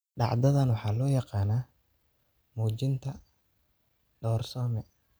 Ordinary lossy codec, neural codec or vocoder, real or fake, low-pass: none; none; real; none